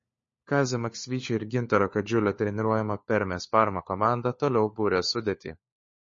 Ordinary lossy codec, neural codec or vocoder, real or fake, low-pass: MP3, 32 kbps; codec, 16 kHz, 4 kbps, FunCodec, trained on LibriTTS, 50 frames a second; fake; 7.2 kHz